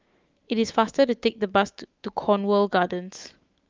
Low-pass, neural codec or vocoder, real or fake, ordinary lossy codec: 7.2 kHz; autoencoder, 48 kHz, 128 numbers a frame, DAC-VAE, trained on Japanese speech; fake; Opus, 32 kbps